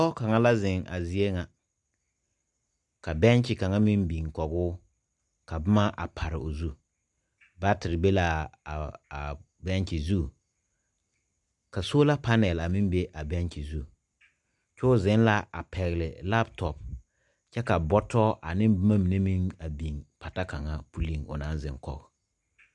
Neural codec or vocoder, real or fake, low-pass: none; real; 10.8 kHz